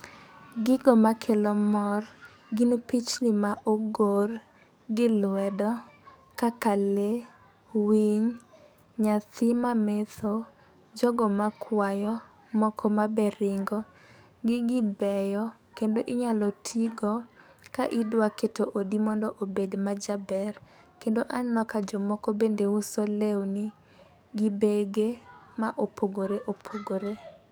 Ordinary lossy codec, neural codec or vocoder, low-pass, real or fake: none; codec, 44.1 kHz, 7.8 kbps, DAC; none; fake